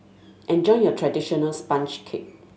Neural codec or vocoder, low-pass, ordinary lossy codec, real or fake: none; none; none; real